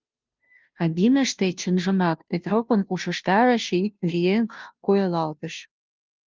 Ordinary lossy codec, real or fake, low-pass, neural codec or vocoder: Opus, 24 kbps; fake; 7.2 kHz; codec, 16 kHz, 0.5 kbps, FunCodec, trained on Chinese and English, 25 frames a second